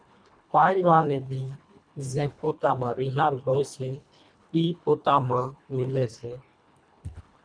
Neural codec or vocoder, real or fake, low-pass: codec, 24 kHz, 1.5 kbps, HILCodec; fake; 9.9 kHz